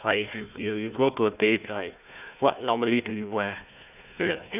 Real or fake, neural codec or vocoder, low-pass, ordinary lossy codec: fake; codec, 16 kHz, 1 kbps, FunCodec, trained on Chinese and English, 50 frames a second; 3.6 kHz; none